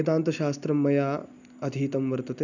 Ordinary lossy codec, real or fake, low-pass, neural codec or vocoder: none; real; 7.2 kHz; none